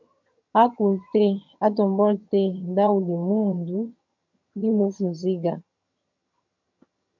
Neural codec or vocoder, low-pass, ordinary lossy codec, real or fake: vocoder, 22.05 kHz, 80 mel bands, HiFi-GAN; 7.2 kHz; MP3, 48 kbps; fake